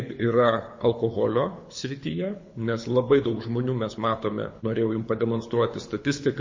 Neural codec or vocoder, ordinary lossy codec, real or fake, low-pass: codec, 24 kHz, 6 kbps, HILCodec; MP3, 32 kbps; fake; 7.2 kHz